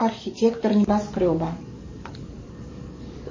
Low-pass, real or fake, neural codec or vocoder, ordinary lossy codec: 7.2 kHz; fake; codec, 44.1 kHz, 7.8 kbps, DAC; MP3, 32 kbps